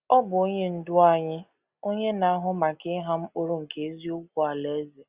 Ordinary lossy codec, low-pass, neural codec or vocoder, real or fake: Opus, 64 kbps; 3.6 kHz; none; real